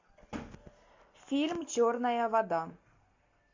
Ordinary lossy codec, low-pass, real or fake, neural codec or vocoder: MP3, 64 kbps; 7.2 kHz; real; none